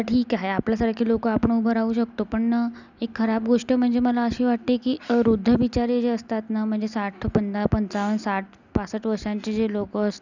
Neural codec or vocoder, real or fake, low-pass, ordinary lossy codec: none; real; 7.2 kHz; none